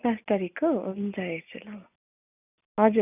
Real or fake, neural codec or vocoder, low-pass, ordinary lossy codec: real; none; 3.6 kHz; none